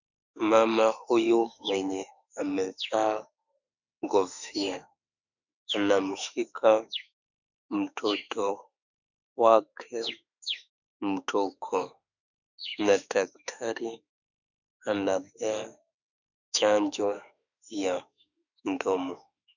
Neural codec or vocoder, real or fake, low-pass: autoencoder, 48 kHz, 32 numbers a frame, DAC-VAE, trained on Japanese speech; fake; 7.2 kHz